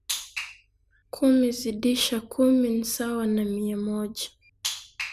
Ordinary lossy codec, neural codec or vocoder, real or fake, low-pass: none; none; real; 14.4 kHz